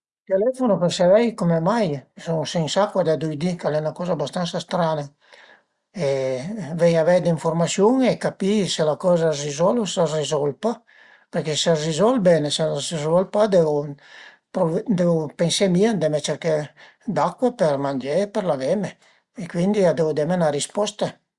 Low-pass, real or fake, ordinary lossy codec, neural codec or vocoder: 10.8 kHz; real; Opus, 64 kbps; none